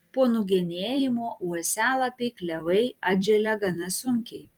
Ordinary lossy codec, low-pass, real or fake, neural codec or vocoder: Opus, 32 kbps; 19.8 kHz; fake; vocoder, 44.1 kHz, 128 mel bands every 256 samples, BigVGAN v2